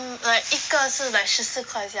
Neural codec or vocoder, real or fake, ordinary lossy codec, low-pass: none; real; none; none